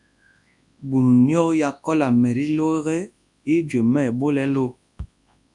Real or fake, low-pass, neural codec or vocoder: fake; 10.8 kHz; codec, 24 kHz, 0.9 kbps, WavTokenizer, large speech release